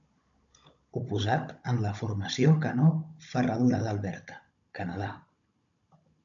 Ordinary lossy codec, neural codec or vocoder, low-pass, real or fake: MP3, 64 kbps; codec, 16 kHz, 16 kbps, FunCodec, trained on Chinese and English, 50 frames a second; 7.2 kHz; fake